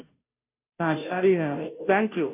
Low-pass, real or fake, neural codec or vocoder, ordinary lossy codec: 3.6 kHz; fake; codec, 16 kHz, 0.5 kbps, FunCodec, trained on Chinese and English, 25 frames a second; none